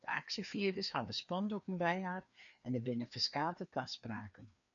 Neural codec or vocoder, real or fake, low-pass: codec, 16 kHz, 2 kbps, FreqCodec, larger model; fake; 7.2 kHz